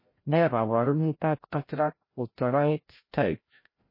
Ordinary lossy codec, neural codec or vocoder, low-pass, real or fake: MP3, 24 kbps; codec, 16 kHz, 0.5 kbps, FreqCodec, larger model; 5.4 kHz; fake